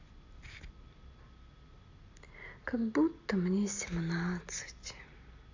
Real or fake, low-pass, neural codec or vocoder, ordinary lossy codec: real; 7.2 kHz; none; AAC, 48 kbps